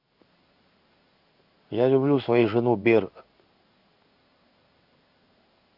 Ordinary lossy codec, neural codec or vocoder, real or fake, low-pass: none; codec, 16 kHz in and 24 kHz out, 1 kbps, XY-Tokenizer; fake; 5.4 kHz